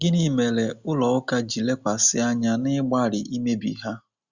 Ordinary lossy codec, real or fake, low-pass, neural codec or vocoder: Opus, 64 kbps; real; 7.2 kHz; none